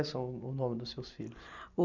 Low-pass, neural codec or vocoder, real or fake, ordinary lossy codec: 7.2 kHz; none; real; none